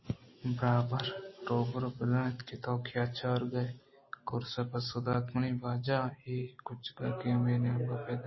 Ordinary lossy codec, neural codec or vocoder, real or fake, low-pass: MP3, 24 kbps; none; real; 7.2 kHz